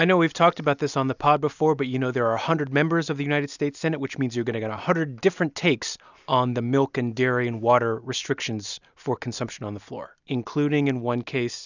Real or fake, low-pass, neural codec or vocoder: real; 7.2 kHz; none